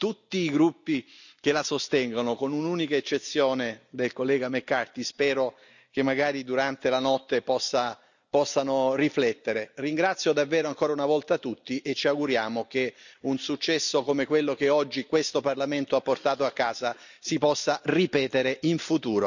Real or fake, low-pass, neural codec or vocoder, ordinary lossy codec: real; 7.2 kHz; none; none